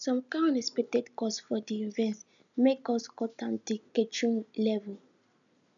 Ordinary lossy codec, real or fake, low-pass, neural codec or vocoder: none; real; 7.2 kHz; none